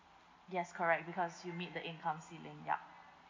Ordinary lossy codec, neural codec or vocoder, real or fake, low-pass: MP3, 48 kbps; none; real; 7.2 kHz